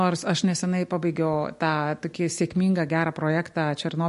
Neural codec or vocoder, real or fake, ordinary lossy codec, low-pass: none; real; MP3, 48 kbps; 14.4 kHz